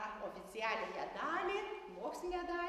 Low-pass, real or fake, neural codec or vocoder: 14.4 kHz; real; none